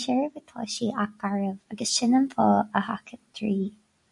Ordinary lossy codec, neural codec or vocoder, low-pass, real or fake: AAC, 64 kbps; none; 10.8 kHz; real